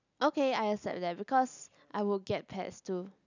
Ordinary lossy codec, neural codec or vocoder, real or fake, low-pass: none; none; real; 7.2 kHz